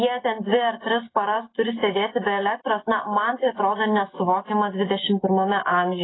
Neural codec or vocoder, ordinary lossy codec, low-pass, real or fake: none; AAC, 16 kbps; 7.2 kHz; real